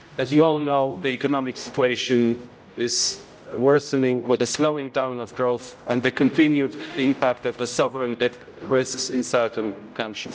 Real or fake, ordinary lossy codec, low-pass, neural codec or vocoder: fake; none; none; codec, 16 kHz, 0.5 kbps, X-Codec, HuBERT features, trained on general audio